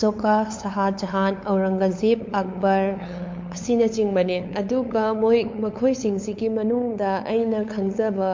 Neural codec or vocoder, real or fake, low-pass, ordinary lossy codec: codec, 16 kHz, 8 kbps, FunCodec, trained on LibriTTS, 25 frames a second; fake; 7.2 kHz; MP3, 48 kbps